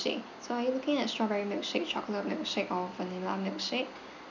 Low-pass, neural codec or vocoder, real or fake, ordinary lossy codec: 7.2 kHz; none; real; none